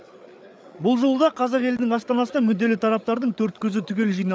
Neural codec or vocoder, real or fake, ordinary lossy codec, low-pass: codec, 16 kHz, 8 kbps, FreqCodec, larger model; fake; none; none